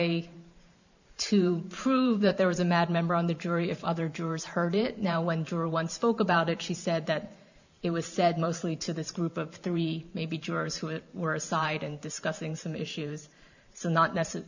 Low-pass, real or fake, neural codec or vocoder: 7.2 kHz; fake; vocoder, 44.1 kHz, 128 mel bands every 512 samples, BigVGAN v2